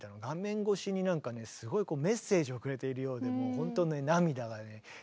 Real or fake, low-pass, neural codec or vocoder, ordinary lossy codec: real; none; none; none